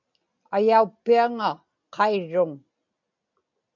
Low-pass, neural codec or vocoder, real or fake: 7.2 kHz; none; real